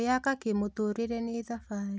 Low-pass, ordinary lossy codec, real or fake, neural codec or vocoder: none; none; real; none